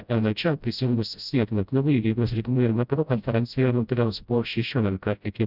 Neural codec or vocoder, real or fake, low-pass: codec, 16 kHz, 0.5 kbps, FreqCodec, smaller model; fake; 5.4 kHz